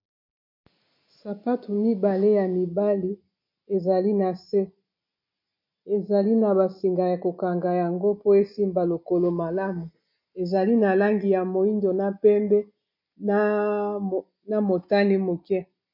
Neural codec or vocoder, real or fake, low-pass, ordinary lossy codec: none; real; 5.4 kHz; MP3, 32 kbps